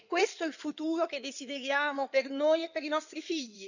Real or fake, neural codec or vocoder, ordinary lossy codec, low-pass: fake; codec, 16 kHz in and 24 kHz out, 2.2 kbps, FireRedTTS-2 codec; none; 7.2 kHz